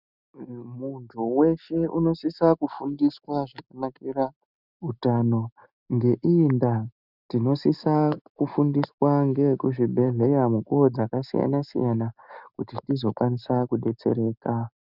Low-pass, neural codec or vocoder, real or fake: 5.4 kHz; none; real